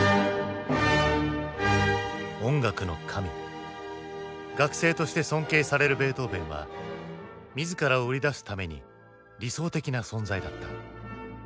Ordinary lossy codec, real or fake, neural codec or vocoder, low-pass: none; real; none; none